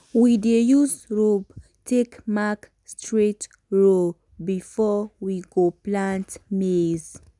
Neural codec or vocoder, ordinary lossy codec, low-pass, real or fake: none; none; 10.8 kHz; real